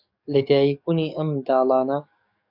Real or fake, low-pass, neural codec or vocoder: fake; 5.4 kHz; codec, 44.1 kHz, 7.8 kbps, DAC